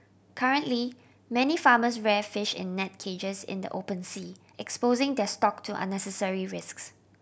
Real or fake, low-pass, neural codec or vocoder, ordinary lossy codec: real; none; none; none